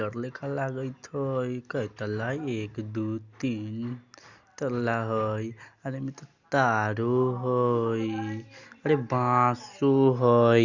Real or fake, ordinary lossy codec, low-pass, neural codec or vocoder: real; Opus, 64 kbps; 7.2 kHz; none